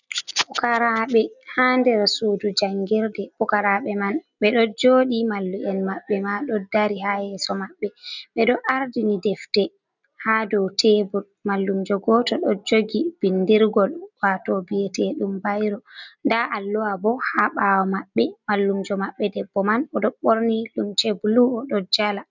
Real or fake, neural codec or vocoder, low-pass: real; none; 7.2 kHz